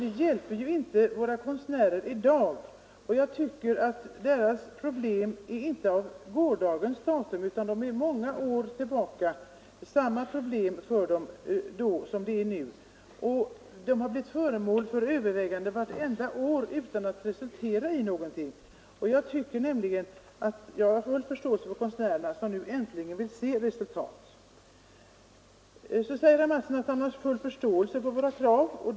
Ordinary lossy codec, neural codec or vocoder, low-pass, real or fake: none; none; none; real